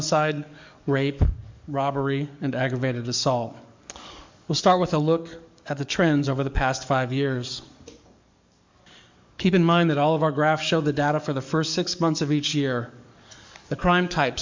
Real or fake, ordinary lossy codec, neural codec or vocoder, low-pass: fake; MP3, 64 kbps; codec, 44.1 kHz, 7.8 kbps, DAC; 7.2 kHz